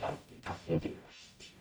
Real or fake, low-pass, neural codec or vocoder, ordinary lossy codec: fake; none; codec, 44.1 kHz, 0.9 kbps, DAC; none